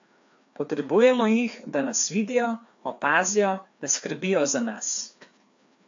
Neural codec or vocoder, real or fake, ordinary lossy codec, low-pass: codec, 16 kHz, 2 kbps, FreqCodec, larger model; fake; AAC, 64 kbps; 7.2 kHz